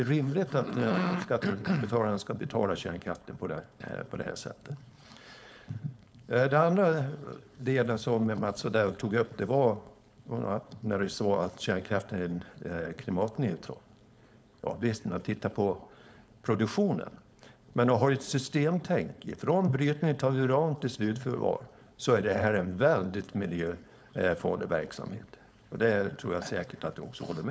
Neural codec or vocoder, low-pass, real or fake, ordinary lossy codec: codec, 16 kHz, 4.8 kbps, FACodec; none; fake; none